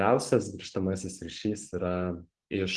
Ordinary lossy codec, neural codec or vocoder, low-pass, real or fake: Opus, 16 kbps; none; 10.8 kHz; real